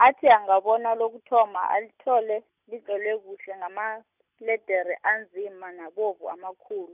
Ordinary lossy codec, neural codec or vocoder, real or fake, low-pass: none; none; real; 3.6 kHz